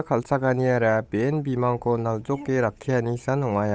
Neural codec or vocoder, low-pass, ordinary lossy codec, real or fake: codec, 16 kHz, 8 kbps, FunCodec, trained on Chinese and English, 25 frames a second; none; none; fake